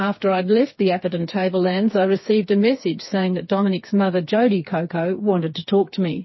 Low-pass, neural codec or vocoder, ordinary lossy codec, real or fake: 7.2 kHz; codec, 16 kHz, 4 kbps, FreqCodec, smaller model; MP3, 24 kbps; fake